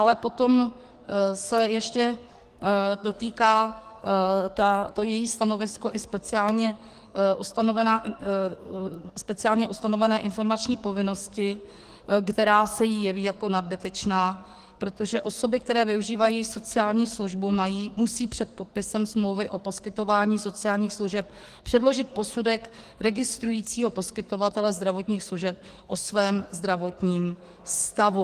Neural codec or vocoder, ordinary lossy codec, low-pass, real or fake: codec, 44.1 kHz, 2.6 kbps, SNAC; Opus, 24 kbps; 14.4 kHz; fake